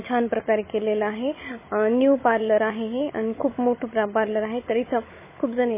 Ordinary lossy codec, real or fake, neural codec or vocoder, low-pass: MP3, 16 kbps; fake; codec, 16 kHz, 16 kbps, FunCodec, trained on Chinese and English, 50 frames a second; 3.6 kHz